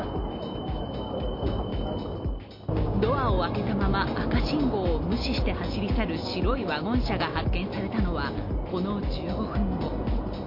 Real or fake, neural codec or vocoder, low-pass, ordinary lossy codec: real; none; 5.4 kHz; MP3, 32 kbps